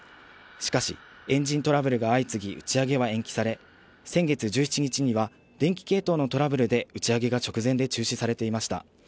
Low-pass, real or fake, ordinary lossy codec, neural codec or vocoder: none; real; none; none